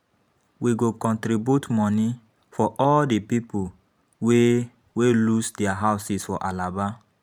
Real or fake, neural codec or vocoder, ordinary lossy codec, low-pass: fake; vocoder, 44.1 kHz, 128 mel bands every 512 samples, BigVGAN v2; none; 19.8 kHz